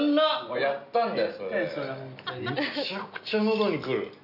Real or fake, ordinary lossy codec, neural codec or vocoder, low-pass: real; none; none; 5.4 kHz